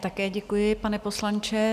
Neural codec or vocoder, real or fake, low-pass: none; real; 14.4 kHz